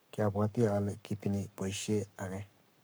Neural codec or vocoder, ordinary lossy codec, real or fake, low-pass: codec, 44.1 kHz, 7.8 kbps, Pupu-Codec; none; fake; none